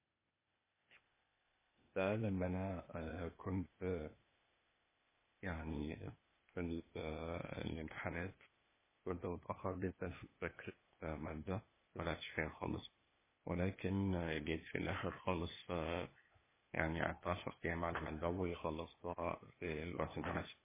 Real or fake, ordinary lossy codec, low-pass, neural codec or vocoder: fake; MP3, 16 kbps; 3.6 kHz; codec, 16 kHz, 0.8 kbps, ZipCodec